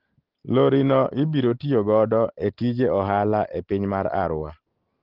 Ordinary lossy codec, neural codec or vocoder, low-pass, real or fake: Opus, 16 kbps; none; 5.4 kHz; real